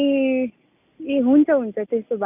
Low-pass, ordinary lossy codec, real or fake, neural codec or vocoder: 3.6 kHz; none; real; none